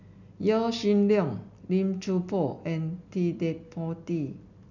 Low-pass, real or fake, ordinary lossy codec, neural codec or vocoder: 7.2 kHz; real; none; none